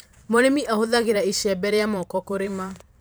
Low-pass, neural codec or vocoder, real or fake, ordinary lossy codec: none; vocoder, 44.1 kHz, 128 mel bands every 512 samples, BigVGAN v2; fake; none